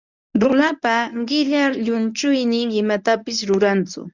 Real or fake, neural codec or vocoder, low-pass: fake; codec, 24 kHz, 0.9 kbps, WavTokenizer, medium speech release version 1; 7.2 kHz